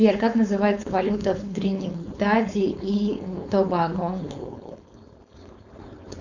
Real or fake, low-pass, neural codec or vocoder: fake; 7.2 kHz; codec, 16 kHz, 4.8 kbps, FACodec